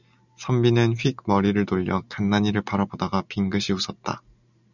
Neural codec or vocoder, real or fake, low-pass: none; real; 7.2 kHz